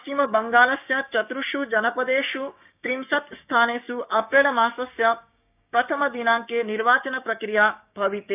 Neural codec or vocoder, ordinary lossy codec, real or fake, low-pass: codec, 16 kHz, 6 kbps, DAC; none; fake; 3.6 kHz